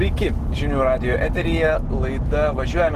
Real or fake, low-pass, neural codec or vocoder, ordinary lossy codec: real; 14.4 kHz; none; Opus, 16 kbps